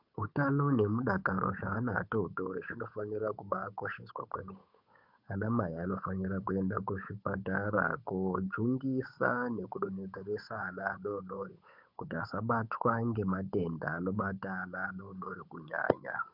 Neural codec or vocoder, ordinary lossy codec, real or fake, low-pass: codec, 16 kHz, 8 kbps, FunCodec, trained on Chinese and English, 25 frames a second; MP3, 48 kbps; fake; 5.4 kHz